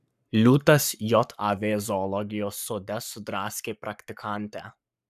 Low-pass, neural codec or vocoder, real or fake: 14.4 kHz; codec, 44.1 kHz, 7.8 kbps, Pupu-Codec; fake